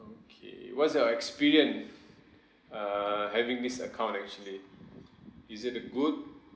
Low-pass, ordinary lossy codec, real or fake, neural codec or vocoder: none; none; real; none